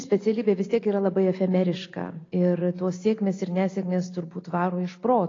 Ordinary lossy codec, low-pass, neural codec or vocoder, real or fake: AAC, 32 kbps; 7.2 kHz; none; real